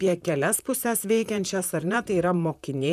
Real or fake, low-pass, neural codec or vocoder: fake; 14.4 kHz; vocoder, 44.1 kHz, 128 mel bands, Pupu-Vocoder